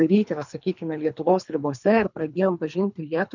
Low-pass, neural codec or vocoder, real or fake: 7.2 kHz; codec, 24 kHz, 3 kbps, HILCodec; fake